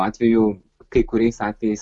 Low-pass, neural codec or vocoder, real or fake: 10.8 kHz; none; real